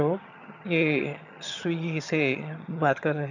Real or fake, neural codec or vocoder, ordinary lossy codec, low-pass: fake; vocoder, 22.05 kHz, 80 mel bands, HiFi-GAN; none; 7.2 kHz